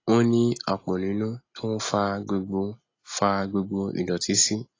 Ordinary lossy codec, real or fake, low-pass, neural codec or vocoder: AAC, 32 kbps; real; 7.2 kHz; none